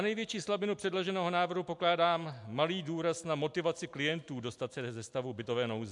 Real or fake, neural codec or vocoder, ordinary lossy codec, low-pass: real; none; MP3, 64 kbps; 10.8 kHz